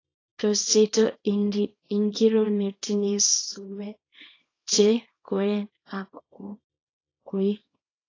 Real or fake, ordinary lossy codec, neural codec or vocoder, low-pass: fake; AAC, 32 kbps; codec, 24 kHz, 0.9 kbps, WavTokenizer, small release; 7.2 kHz